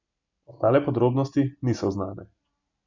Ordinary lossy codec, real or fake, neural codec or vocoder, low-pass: none; real; none; 7.2 kHz